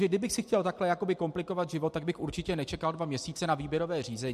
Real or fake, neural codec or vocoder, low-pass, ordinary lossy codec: real; none; 14.4 kHz; MP3, 64 kbps